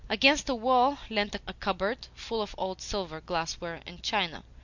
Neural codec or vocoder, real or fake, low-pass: none; real; 7.2 kHz